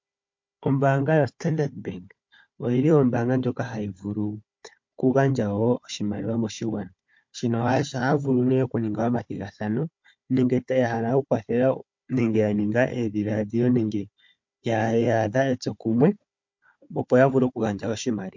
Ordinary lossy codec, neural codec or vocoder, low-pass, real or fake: MP3, 48 kbps; codec, 16 kHz, 4 kbps, FunCodec, trained on Chinese and English, 50 frames a second; 7.2 kHz; fake